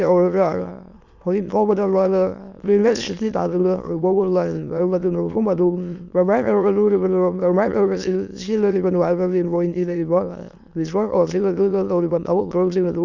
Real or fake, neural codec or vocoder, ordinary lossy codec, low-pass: fake; autoencoder, 22.05 kHz, a latent of 192 numbers a frame, VITS, trained on many speakers; none; 7.2 kHz